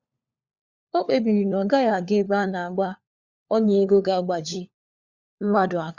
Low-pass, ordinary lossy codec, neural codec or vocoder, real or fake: 7.2 kHz; Opus, 64 kbps; codec, 16 kHz, 4 kbps, FunCodec, trained on LibriTTS, 50 frames a second; fake